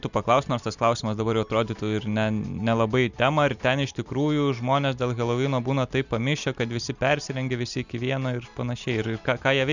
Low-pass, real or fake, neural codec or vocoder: 7.2 kHz; real; none